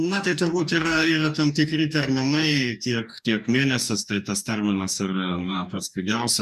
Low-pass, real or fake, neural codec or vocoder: 14.4 kHz; fake; codec, 44.1 kHz, 2.6 kbps, DAC